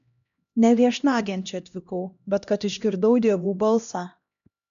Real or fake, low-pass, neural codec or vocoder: fake; 7.2 kHz; codec, 16 kHz, 1 kbps, X-Codec, HuBERT features, trained on LibriSpeech